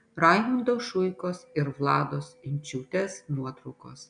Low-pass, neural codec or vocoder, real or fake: 9.9 kHz; none; real